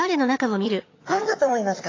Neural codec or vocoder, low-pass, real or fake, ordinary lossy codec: vocoder, 22.05 kHz, 80 mel bands, HiFi-GAN; 7.2 kHz; fake; AAC, 32 kbps